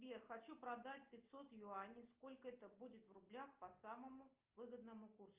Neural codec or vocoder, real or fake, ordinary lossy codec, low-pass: none; real; Opus, 24 kbps; 3.6 kHz